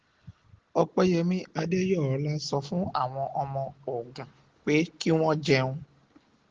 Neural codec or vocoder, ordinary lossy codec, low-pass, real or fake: none; Opus, 16 kbps; 7.2 kHz; real